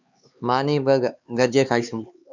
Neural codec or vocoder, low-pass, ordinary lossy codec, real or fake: codec, 16 kHz, 4 kbps, X-Codec, HuBERT features, trained on LibriSpeech; 7.2 kHz; Opus, 64 kbps; fake